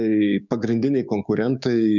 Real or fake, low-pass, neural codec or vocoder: fake; 7.2 kHz; codec, 16 kHz, 6 kbps, DAC